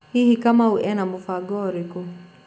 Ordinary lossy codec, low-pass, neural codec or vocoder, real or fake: none; none; none; real